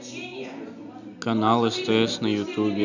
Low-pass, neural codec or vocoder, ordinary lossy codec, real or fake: 7.2 kHz; none; none; real